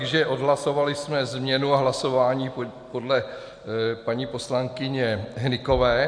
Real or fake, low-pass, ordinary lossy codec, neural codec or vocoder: real; 9.9 kHz; Opus, 64 kbps; none